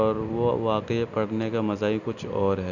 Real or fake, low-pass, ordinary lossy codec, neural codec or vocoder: real; 7.2 kHz; none; none